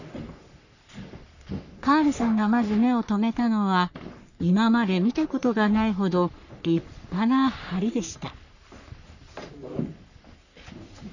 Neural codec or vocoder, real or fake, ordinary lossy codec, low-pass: codec, 44.1 kHz, 3.4 kbps, Pupu-Codec; fake; none; 7.2 kHz